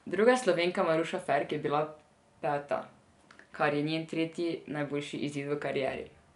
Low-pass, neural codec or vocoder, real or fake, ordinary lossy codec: 10.8 kHz; none; real; none